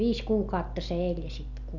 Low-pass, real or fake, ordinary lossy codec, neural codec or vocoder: 7.2 kHz; real; none; none